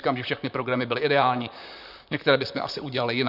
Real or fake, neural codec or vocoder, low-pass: fake; vocoder, 44.1 kHz, 128 mel bands, Pupu-Vocoder; 5.4 kHz